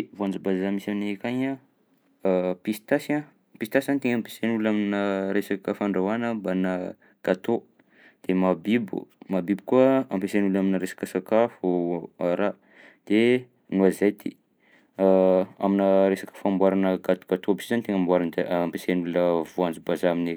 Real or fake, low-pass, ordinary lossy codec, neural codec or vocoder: real; none; none; none